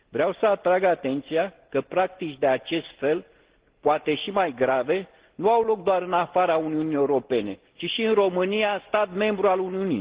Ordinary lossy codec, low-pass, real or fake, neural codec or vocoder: Opus, 16 kbps; 3.6 kHz; real; none